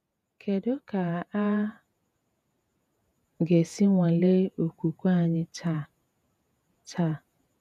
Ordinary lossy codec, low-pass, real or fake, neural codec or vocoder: none; 14.4 kHz; fake; vocoder, 48 kHz, 128 mel bands, Vocos